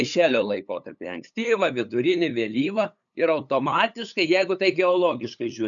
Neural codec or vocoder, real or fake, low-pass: codec, 16 kHz, 8 kbps, FunCodec, trained on LibriTTS, 25 frames a second; fake; 7.2 kHz